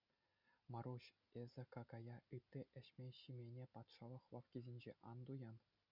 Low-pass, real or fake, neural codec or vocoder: 5.4 kHz; real; none